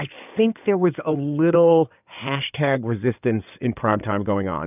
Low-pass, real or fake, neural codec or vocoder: 3.6 kHz; fake; codec, 16 kHz in and 24 kHz out, 2.2 kbps, FireRedTTS-2 codec